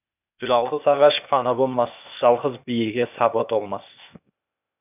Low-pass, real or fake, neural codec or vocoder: 3.6 kHz; fake; codec, 16 kHz, 0.8 kbps, ZipCodec